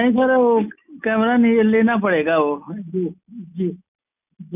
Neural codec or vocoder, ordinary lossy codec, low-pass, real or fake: none; none; 3.6 kHz; real